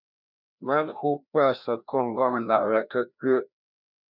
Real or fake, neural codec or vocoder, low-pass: fake; codec, 16 kHz, 1 kbps, FreqCodec, larger model; 5.4 kHz